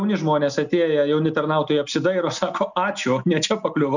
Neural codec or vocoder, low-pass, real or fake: none; 7.2 kHz; real